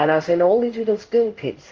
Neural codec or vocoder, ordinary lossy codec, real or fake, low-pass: codec, 16 kHz in and 24 kHz out, 1 kbps, XY-Tokenizer; Opus, 24 kbps; fake; 7.2 kHz